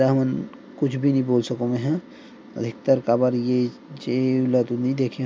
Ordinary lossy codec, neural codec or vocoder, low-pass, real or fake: none; none; none; real